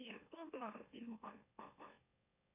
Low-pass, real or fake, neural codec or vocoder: 3.6 kHz; fake; autoencoder, 44.1 kHz, a latent of 192 numbers a frame, MeloTTS